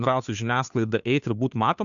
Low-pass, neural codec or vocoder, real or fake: 7.2 kHz; codec, 16 kHz, 2 kbps, FunCodec, trained on Chinese and English, 25 frames a second; fake